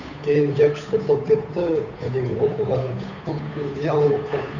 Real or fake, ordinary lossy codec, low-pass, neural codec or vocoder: fake; none; 7.2 kHz; codec, 16 kHz, 8 kbps, FunCodec, trained on Chinese and English, 25 frames a second